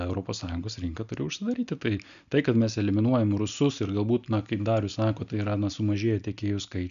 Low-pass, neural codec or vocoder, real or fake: 7.2 kHz; none; real